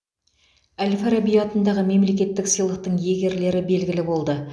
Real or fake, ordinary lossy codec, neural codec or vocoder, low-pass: real; none; none; none